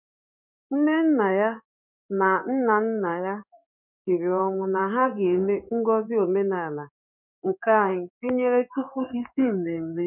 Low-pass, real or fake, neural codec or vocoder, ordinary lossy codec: 3.6 kHz; fake; codec, 16 kHz in and 24 kHz out, 1 kbps, XY-Tokenizer; none